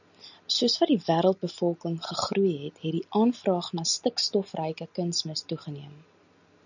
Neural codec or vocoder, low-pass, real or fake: none; 7.2 kHz; real